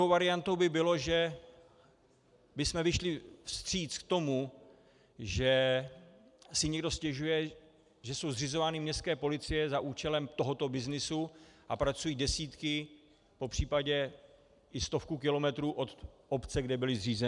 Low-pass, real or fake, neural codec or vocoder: 10.8 kHz; real; none